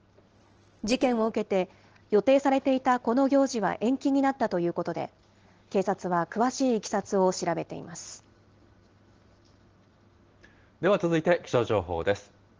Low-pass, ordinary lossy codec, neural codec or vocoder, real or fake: 7.2 kHz; Opus, 16 kbps; none; real